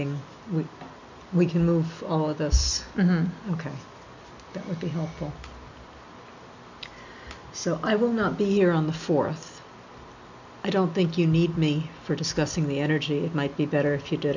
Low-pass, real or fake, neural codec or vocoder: 7.2 kHz; real; none